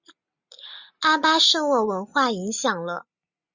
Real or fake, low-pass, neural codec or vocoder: real; 7.2 kHz; none